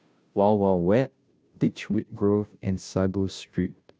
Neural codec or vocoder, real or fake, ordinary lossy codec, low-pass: codec, 16 kHz, 0.5 kbps, FunCodec, trained on Chinese and English, 25 frames a second; fake; none; none